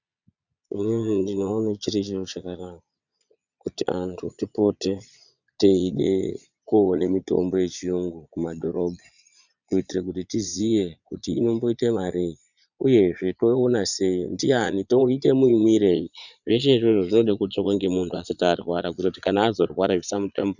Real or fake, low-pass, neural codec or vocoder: fake; 7.2 kHz; vocoder, 22.05 kHz, 80 mel bands, Vocos